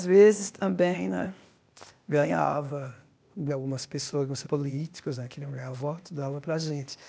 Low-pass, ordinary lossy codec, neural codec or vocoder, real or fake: none; none; codec, 16 kHz, 0.8 kbps, ZipCodec; fake